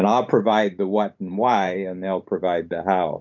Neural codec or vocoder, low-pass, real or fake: vocoder, 44.1 kHz, 128 mel bands every 512 samples, BigVGAN v2; 7.2 kHz; fake